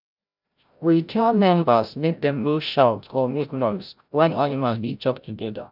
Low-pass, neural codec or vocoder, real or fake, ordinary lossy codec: 5.4 kHz; codec, 16 kHz, 0.5 kbps, FreqCodec, larger model; fake; none